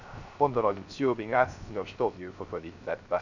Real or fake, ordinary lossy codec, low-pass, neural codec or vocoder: fake; none; 7.2 kHz; codec, 16 kHz, 0.3 kbps, FocalCodec